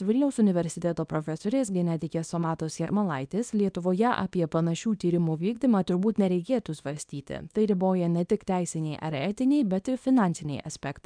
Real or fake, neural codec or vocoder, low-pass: fake; codec, 24 kHz, 0.9 kbps, WavTokenizer, small release; 9.9 kHz